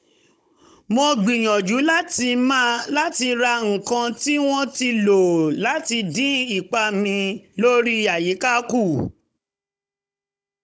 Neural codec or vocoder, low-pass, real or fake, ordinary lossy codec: codec, 16 kHz, 16 kbps, FunCodec, trained on Chinese and English, 50 frames a second; none; fake; none